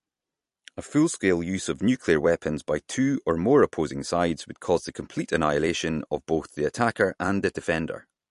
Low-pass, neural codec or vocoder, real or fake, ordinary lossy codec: 14.4 kHz; vocoder, 44.1 kHz, 128 mel bands every 256 samples, BigVGAN v2; fake; MP3, 48 kbps